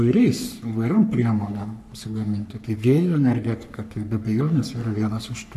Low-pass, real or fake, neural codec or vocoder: 14.4 kHz; fake; codec, 44.1 kHz, 3.4 kbps, Pupu-Codec